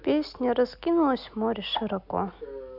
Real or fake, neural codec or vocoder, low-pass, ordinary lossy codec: real; none; 5.4 kHz; none